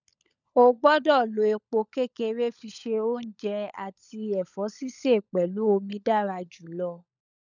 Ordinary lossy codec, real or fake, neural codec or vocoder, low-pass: none; fake; codec, 16 kHz, 16 kbps, FunCodec, trained on LibriTTS, 50 frames a second; 7.2 kHz